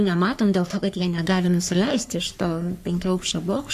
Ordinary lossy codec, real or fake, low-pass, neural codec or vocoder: AAC, 96 kbps; fake; 14.4 kHz; codec, 44.1 kHz, 3.4 kbps, Pupu-Codec